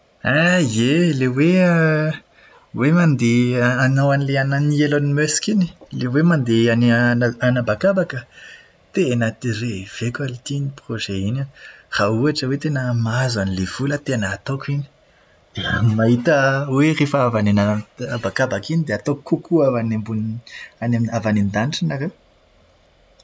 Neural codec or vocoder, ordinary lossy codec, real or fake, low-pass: none; none; real; none